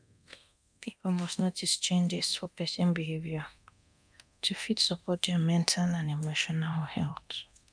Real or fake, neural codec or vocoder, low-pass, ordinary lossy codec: fake; codec, 24 kHz, 0.9 kbps, DualCodec; 9.9 kHz; none